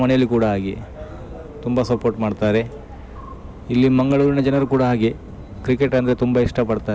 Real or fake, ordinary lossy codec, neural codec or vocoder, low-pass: real; none; none; none